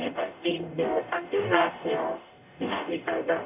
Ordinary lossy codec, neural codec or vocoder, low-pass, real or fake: none; codec, 44.1 kHz, 0.9 kbps, DAC; 3.6 kHz; fake